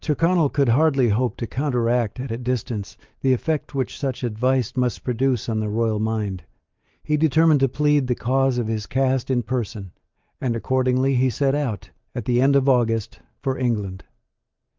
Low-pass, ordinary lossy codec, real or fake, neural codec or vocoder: 7.2 kHz; Opus, 24 kbps; real; none